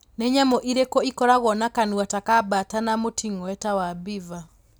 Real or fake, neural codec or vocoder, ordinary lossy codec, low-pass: real; none; none; none